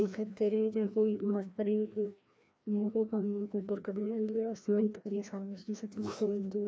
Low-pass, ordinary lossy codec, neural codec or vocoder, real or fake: none; none; codec, 16 kHz, 1 kbps, FreqCodec, larger model; fake